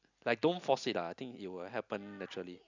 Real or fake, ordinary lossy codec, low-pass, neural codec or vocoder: real; none; 7.2 kHz; none